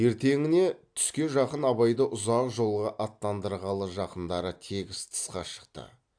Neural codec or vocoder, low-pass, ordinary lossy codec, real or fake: none; 9.9 kHz; AAC, 64 kbps; real